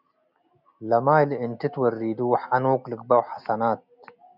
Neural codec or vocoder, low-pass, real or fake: none; 5.4 kHz; real